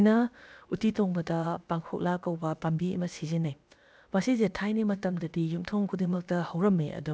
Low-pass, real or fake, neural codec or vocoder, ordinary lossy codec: none; fake; codec, 16 kHz, about 1 kbps, DyCAST, with the encoder's durations; none